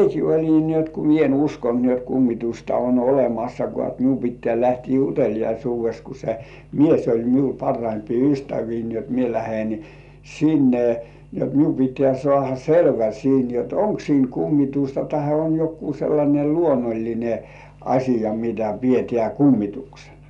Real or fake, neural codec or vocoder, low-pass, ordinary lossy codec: real; none; 10.8 kHz; none